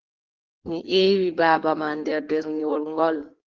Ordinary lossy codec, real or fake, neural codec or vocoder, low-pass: Opus, 16 kbps; fake; codec, 16 kHz in and 24 kHz out, 1.1 kbps, FireRedTTS-2 codec; 7.2 kHz